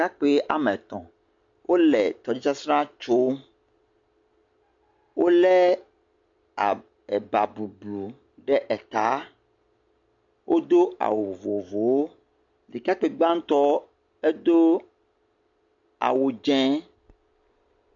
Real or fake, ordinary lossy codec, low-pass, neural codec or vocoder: real; MP3, 48 kbps; 7.2 kHz; none